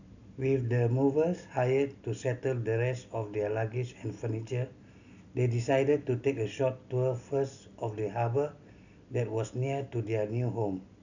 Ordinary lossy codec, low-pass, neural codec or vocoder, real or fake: none; 7.2 kHz; none; real